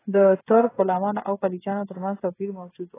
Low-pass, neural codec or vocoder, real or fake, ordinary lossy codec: 3.6 kHz; codec, 16 kHz, 8 kbps, FreqCodec, smaller model; fake; AAC, 16 kbps